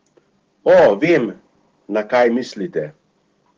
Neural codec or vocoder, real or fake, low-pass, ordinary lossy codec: none; real; 7.2 kHz; Opus, 16 kbps